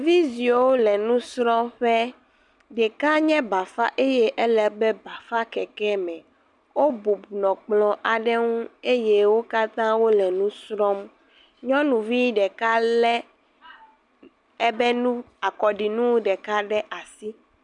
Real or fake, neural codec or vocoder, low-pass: real; none; 10.8 kHz